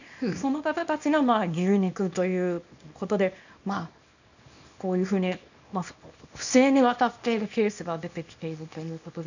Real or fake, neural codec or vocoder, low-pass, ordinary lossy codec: fake; codec, 24 kHz, 0.9 kbps, WavTokenizer, small release; 7.2 kHz; none